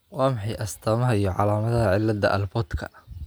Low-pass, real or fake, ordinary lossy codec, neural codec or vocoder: none; real; none; none